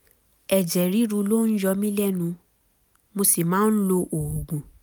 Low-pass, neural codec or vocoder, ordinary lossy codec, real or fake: none; none; none; real